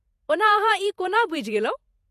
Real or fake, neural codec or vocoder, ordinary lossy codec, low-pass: fake; vocoder, 48 kHz, 128 mel bands, Vocos; MP3, 64 kbps; 14.4 kHz